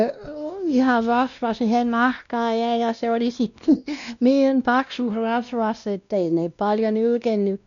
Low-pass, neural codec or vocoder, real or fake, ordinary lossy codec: 7.2 kHz; codec, 16 kHz, 1 kbps, X-Codec, WavLM features, trained on Multilingual LibriSpeech; fake; none